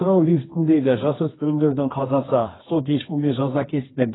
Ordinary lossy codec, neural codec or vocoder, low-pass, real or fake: AAC, 16 kbps; codec, 24 kHz, 0.9 kbps, WavTokenizer, medium music audio release; 7.2 kHz; fake